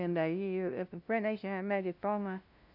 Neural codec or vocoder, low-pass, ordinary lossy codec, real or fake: codec, 16 kHz, 0.5 kbps, FunCodec, trained on LibriTTS, 25 frames a second; 5.4 kHz; none; fake